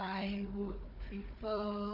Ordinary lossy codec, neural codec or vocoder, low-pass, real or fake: none; codec, 16 kHz, 4 kbps, FunCodec, trained on Chinese and English, 50 frames a second; 5.4 kHz; fake